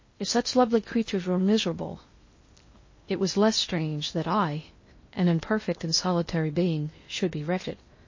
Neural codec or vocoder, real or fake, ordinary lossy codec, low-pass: codec, 16 kHz in and 24 kHz out, 0.6 kbps, FocalCodec, streaming, 2048 codes; fake; MP3, 32 kbps; 7.2 kHz